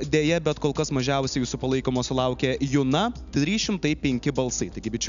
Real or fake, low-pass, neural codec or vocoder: real; 7.2 kHz; none